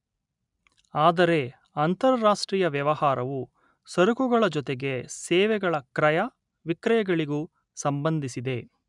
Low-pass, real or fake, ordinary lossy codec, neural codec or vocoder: 10.8 kHz; real; none; none